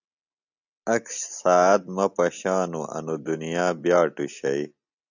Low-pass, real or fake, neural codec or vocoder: 7.2 kHz; real; none